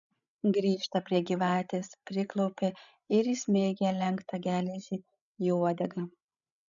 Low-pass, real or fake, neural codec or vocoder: 7.2 kHz; fake; codec, 16 kHz, 16 kbps, FreqCodec, larger model